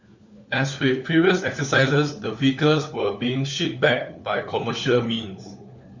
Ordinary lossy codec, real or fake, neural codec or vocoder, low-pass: Opus, 64 kbps; fake; codec, 16 kHz, 4 kbps, FunCodec, trained on LibriTTS, 50 frames a second; 7.2 kHz